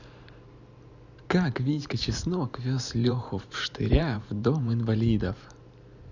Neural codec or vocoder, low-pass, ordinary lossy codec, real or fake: vocoder, 44.1 kHz, 128 mel bands every 512 samples, BigVGAN v2; 7.2 kHz; none; fake